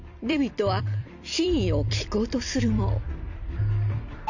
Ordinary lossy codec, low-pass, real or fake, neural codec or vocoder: none; 7.2 kHz; fake; vocoder, 44.1 kHz, 128 mel bands every 256 samples, BigVGAN v2